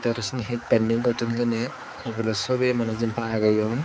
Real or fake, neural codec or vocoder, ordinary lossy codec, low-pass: fake; codec, 16 kHz, 4 kbps, X-Codec, HuBERT features, trained on balanced general audio; none; none